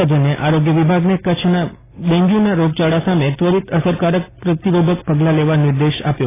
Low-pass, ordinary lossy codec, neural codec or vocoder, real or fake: 3.6 kHz; AAC, 16 kbps; none; real